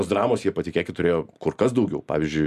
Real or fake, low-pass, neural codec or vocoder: real; 14.4 kHz; none